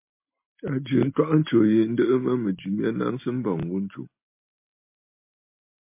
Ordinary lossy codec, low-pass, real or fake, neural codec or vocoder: MP3, 24 kbps; 3.6 kHz; real; none